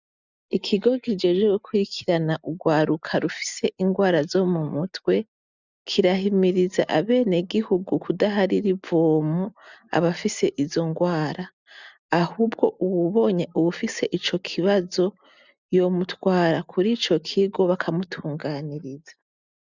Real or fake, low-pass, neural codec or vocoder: real; 7.2 kHz; none